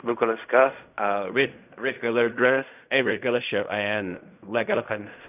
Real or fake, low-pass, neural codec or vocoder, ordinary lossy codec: fake; 3.6 kHz; codec, 16 kHz in and 24 kHz out, 0.4 kbps, LongCat-Audio-Codec, fine tuned four codebook decoder; none